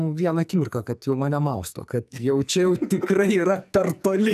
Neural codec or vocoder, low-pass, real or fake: codec, 44.1 kHz, 2.6 kbps, SNAC; 14.4 kHz; fake